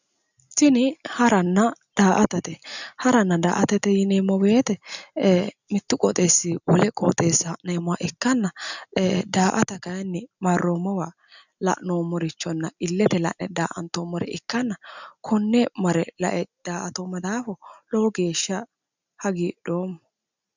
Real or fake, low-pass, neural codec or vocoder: real; 7.2 kHz; none